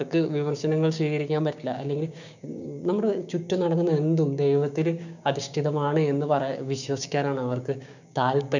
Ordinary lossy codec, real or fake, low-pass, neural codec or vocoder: none; fake; 7.2 kHz; codec, 16 kHz, 6 kbps, DAC